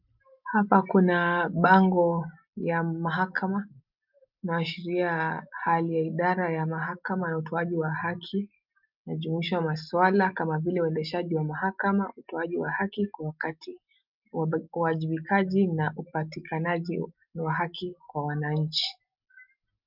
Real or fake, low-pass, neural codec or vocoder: real; 5.4 kHz; none